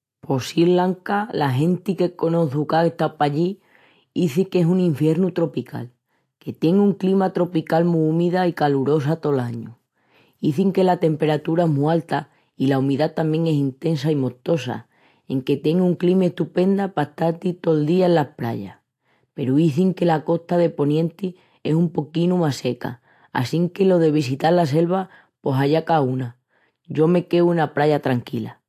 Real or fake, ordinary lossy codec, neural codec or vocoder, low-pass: real; AAC, 64 kbps; none; 14.4 kHz